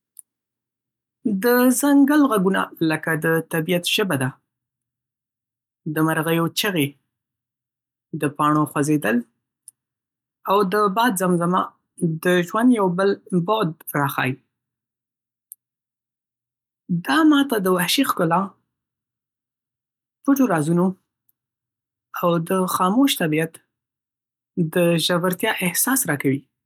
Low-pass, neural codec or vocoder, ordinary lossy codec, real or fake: 19.8 kHz; none; none; real